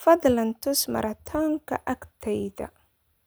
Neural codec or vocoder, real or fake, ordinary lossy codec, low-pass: none; real; none; none